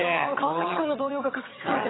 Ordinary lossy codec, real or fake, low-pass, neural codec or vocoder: AAC, 16 kbps; fake; 7.2 kHz; vocoder, 22.05 kHz, 80 mel bands, HiFi-GAN